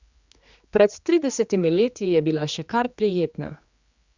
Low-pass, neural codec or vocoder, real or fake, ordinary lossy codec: 7.2 kHz; codec, 16 kHz, 2 kbps, X-Codec, HuBERT features, trained on general audio; fake; Opus, 64 kbps